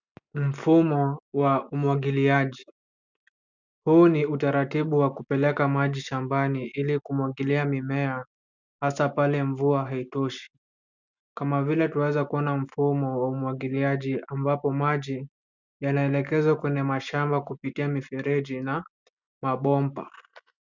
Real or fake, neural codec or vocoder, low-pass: real; none; 7.2 kHz